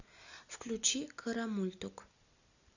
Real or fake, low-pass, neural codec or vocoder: real; 7.2 kHz; none